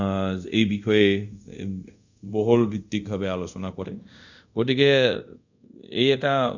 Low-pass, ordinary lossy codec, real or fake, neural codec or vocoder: 7.2 kHz; none; fake; codec, 24 kHz, 0.5 kbps, DualCodec